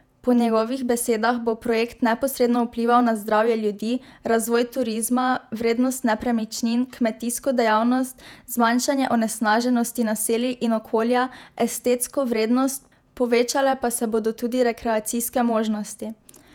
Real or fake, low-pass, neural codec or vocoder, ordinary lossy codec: fake; 19.8 kHz; vocoder, 48 kHz, 128 mel bands, Vocos; none